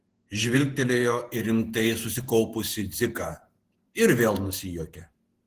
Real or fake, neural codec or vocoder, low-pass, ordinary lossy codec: fake; vocoder, 48 kHz, 128 mel bands, Vocos; 14.4 kHz; Opus, 16 kbps